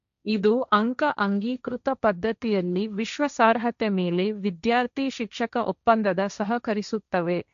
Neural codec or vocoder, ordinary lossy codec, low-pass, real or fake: codec, 16 kHz, 1.1 kbps, Voila-Tokenizer; MP3, 64 kbps; 7.2 kHz; fake